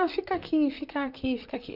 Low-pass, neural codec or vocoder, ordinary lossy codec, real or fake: 5.4 kHz; vocoder, 44.1 kHz, 128 mel bands, Pupu-Vocoder; none; fake